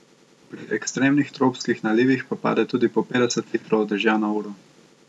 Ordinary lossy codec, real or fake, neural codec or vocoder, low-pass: none; real; none; none